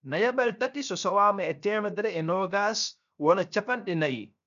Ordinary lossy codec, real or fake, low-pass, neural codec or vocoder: none; fake; 7.2 kHz; codec, 16 kHz, 0.7 kbps, FocalCodec